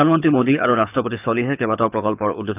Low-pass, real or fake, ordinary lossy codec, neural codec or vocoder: 3.6 kHz; fake; none; codec, 24 kHz, 6 kbps, HILCodec